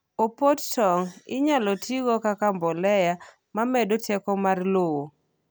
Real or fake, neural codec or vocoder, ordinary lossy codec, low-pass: real; none; none; none